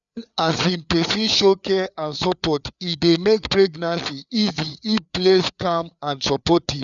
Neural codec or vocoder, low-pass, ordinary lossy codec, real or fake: codec, 16 kHz, 4 kbps, FreqCodec, larger model; 7.2 kHz; none; fake